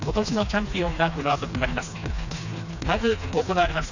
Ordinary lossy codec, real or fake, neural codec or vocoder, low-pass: none; fake; codec, 16 kHz, 2 kbps, FreqCodec, smaller model; 7.2 kHz